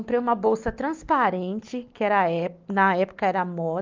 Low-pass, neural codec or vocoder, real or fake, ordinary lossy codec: 7.2 kHz; none; real; Opus, 24 kbps